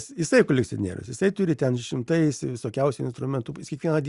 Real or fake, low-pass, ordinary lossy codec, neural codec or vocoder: real; 10.8 kHz; Opus, 64 kbps; none